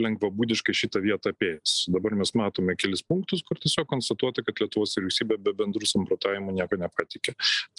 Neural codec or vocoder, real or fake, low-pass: none; real; 10.8 kHz